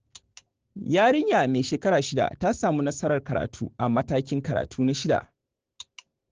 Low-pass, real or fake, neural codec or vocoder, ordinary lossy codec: 7.2 kHz; fake; codec, 16 kHz, 6 kbps, DAC; Opus, 16 kbps